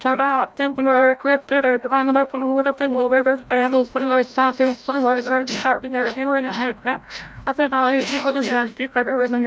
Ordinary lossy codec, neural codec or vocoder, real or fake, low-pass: none; codec, 16 kHz, 0.5 kbps, FreqCodec, larger model; fake; none